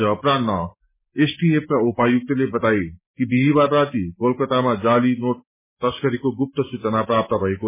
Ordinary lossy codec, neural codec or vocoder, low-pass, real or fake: MP3, 16 kbps; none; 3.6 kHz; real